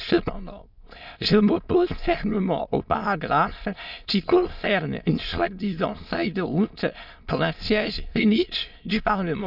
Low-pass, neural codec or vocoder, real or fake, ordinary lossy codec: 5.4 kHz; autoencoder, 22.05 kHz, a latent of 192 numbers a frame, VITS, trained on many speakers; fake; none